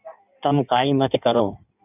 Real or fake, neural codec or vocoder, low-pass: fake; codec, 16 kHz in and 24 kHz out, 1.1 kbps, FireRedTTS-2 codec; 3.6 kHz